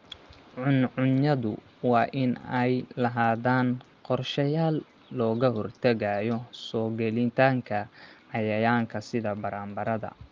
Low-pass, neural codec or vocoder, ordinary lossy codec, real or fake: 7.2 kHz; none; Opus, 32 kbps; real